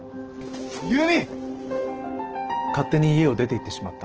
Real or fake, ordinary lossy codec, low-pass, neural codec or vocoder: real; Opus, 16 kbps; 7.2 kHz; none